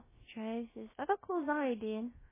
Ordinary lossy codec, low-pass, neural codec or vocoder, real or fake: MP3, 16 kbps; 3.6 kHz; codec, 16 kHz, about 1 kbps, DyCAST, with the encoder's durations; fake